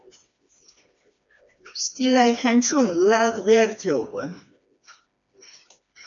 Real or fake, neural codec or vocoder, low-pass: fake; codec, 16 kHz, 2 kbps, FreqCodec, smaller model; 7.2 kHz